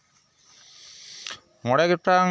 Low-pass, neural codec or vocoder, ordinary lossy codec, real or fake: none; none; none; real